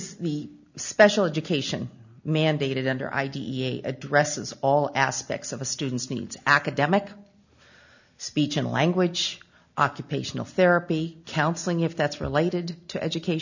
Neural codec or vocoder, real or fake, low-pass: none; real; 7.2 kHz